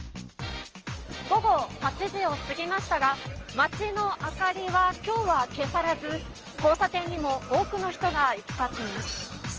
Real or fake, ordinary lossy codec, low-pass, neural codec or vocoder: real; Opus, 24 kbps; 7.2 kHz; none